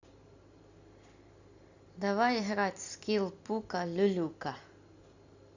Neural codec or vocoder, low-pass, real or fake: none; 7.2 kHz; real